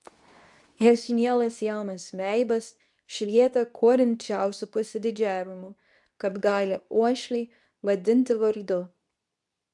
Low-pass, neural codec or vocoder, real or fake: 10.8 kHz; codec, 24 kHz, 0.9 kbps, WavTokenizer, medium speech release version 2; fake